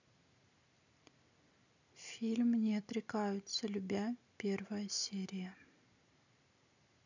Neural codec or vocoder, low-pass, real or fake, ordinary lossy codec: none; 7.2 kHz; real; none